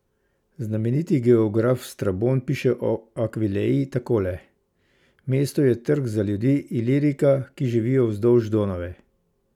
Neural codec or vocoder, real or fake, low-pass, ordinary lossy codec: none; real; 19.8 kHz; none